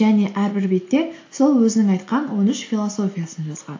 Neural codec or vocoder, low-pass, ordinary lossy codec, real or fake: none; 7.2 kHz; AAC, 48 kbps; real